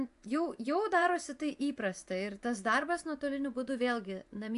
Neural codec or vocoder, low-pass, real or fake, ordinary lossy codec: vocoder, 24 kHz, 100 mel bands, Vocos; 10.8 kHz; fake; AAC, 64 kbps